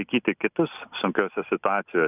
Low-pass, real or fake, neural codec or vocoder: 3.6 kHz; real; none